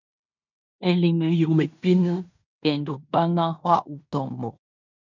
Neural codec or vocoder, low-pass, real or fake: codec, 16 kHz in and 24 kHz out, 0.9 kbps, LongCat-Audio-Codec, fine tuned four codebook decoder; 7.2 kHz; fake